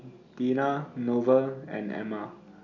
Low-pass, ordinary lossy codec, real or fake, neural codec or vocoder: 7.2 kHz; none; real; none